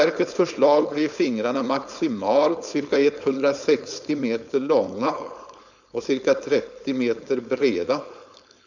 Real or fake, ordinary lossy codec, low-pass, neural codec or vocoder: fake; none; 7.2 kHz; codec, 16 kHz, 4.8 kbps, FACodec